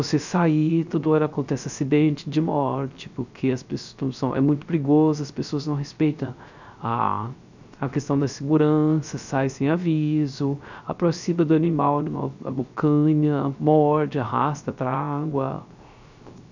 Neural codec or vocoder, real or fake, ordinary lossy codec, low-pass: codec, 16 kHz, 0.3 kbps, FocalCodec; fake; none; 7.2 kHz